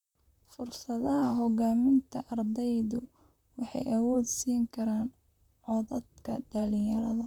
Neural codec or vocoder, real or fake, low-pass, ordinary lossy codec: vocoder, 44.1 kHz, 128 mel bands, Pupu-Vocoder; fake; 19.8 kHz; none